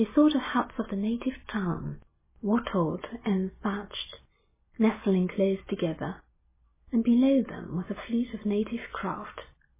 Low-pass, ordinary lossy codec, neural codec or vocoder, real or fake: 3.6 kHz; MP3, 16 kbps; none; real